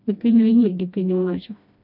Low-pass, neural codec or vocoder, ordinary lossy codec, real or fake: 5.4 kHz; codec, 16 kHz, 1 kbps, FreqCodec, smaller model; MP3, 48 kbps; fake